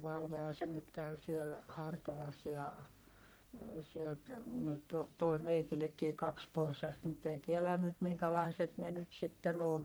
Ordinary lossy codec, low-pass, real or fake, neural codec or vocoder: none; none; fake; codec, 44.1 kHz, 1.7 kbps, Pupu-Codec